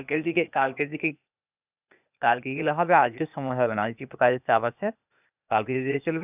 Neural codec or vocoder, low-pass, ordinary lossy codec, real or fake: codec, 16 kHz, 0.8 kbps, ZipCodec; 3.6 kHz; none; fake